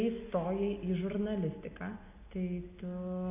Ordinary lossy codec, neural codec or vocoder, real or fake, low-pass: AAC, 32 kbps; none; real; 3.6 kHz